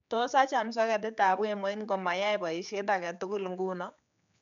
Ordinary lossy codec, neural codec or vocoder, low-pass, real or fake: none; codec, 16 kHz, 4 kbps, X-Codec, HuBERT features, trained on general audio; 7.2 kHz; fake